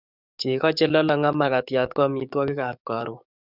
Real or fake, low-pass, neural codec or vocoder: fake; 5.4 kHz; codec, 44.1 kHz, 7.8 kbps, DAC